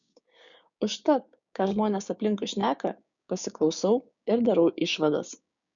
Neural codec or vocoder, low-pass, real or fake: codec, 16 kHz, 6 kbps, DAC; 7.2 kHz; fake